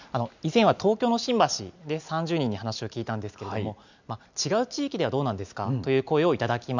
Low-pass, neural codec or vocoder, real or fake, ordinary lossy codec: 7.2 kHz; none; real; none